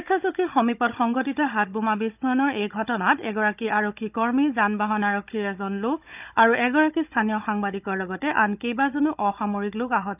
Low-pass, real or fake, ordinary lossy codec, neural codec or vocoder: 3.6 kHz; fake; none; codec, 16 kHz, 16 kbps, FunCodec, trained on Chinese and English, 50 frames a second